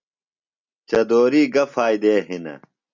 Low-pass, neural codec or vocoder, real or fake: 7.2 kHz; none; real